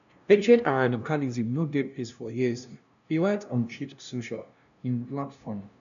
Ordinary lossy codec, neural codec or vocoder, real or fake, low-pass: none; codec, 16 kHz, 0.5 kbps, FunCodec, trained on LibriTTS, 25 frames a second; fake; 7.2 kHz